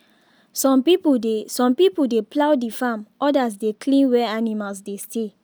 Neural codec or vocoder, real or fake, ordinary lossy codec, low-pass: none; real; none; none